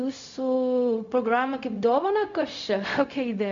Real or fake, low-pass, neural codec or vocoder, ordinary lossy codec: fake; 7.2 kHz; codec, 16 kHz, 0.4 kbps, LongCat-Audio-Codec; MP3, 64 kbps